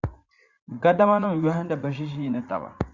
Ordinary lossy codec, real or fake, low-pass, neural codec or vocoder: Opus, 64 kbps; fake; 7.2 kHz; vocoder, 44.1 kHz, 80 mel bands, Vocos